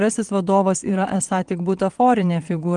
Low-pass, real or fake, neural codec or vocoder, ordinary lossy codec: 9.9 kHz; fake; vocoder, 22.05 kHz, 80 mel bands, WaveNeXt; Opus, 24 kbps